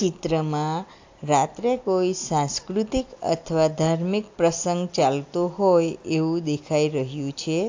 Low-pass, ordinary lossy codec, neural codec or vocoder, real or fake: 7.2 kHz; AAC, 48 kbps; none; real